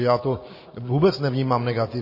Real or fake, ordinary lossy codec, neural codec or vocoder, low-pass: real; MP3, 24 kbps; none; 5.4 kHz